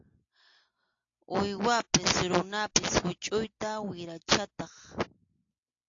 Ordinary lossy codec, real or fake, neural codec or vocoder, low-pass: AAC, 48 kbps; real; none; 7.2 kHz